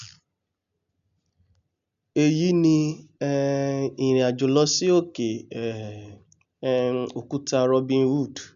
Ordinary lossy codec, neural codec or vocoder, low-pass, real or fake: none; none; 7.2 kHz; real